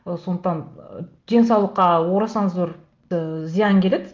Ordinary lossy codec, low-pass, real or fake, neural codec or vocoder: Opus, 32 kbps; 7.2 kHz; real; none